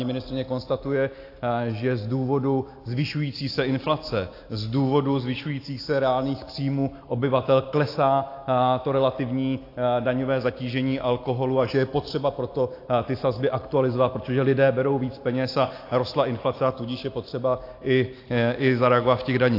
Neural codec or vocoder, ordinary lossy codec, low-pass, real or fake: none; AAC, 32 kbps; 5.4 kHz; real